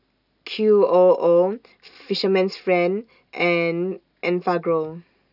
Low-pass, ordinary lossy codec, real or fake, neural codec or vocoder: 5.4 kHz; none; real; none